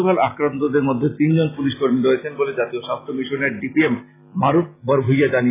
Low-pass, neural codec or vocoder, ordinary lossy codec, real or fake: 3.6 kHz; none; AAC, 16 kbps; real